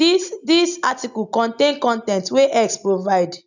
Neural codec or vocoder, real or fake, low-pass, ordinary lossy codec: none; real; 7.2 kHz; none